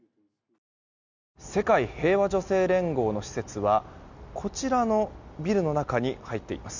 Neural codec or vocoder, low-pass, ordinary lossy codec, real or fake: none; 7.2 kHz; none; real